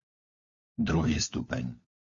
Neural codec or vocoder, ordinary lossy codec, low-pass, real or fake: codec, 16 kHz, 4 kbps, FunCodec, trained on LibriTTS, 50 frames a second; MP3, 48 kbps; 7.2 kHz; fake